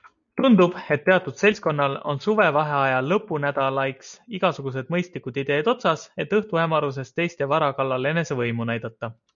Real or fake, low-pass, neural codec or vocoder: real; 7.2 kHz; none